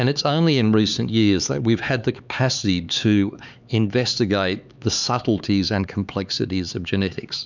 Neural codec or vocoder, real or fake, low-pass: codec, 16 kHz, 4 kbps, X-Codec, HuBERT features, trained on LibriSpeech; fake; 7.2 kHz